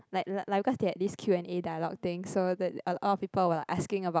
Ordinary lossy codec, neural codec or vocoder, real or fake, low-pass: none; none; real; none